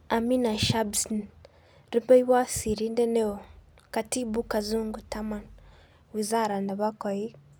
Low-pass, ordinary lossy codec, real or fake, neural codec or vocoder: none; none; real; none